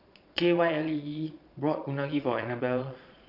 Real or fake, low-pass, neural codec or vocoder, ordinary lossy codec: fake; 5.4 kHz; vocoder, 22.05 kHz, 80 mel bands, Vocos; none